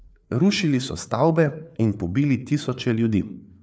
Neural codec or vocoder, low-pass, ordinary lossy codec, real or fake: codec, 16 kHz, 4 kbps, FreqCodec, larger model; none; none; fake